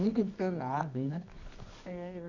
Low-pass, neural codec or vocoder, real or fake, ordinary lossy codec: 7.2 kHz; codec, 24 kHz, 0.9 kbps, WavTokenizer, medium music audio release; fake; none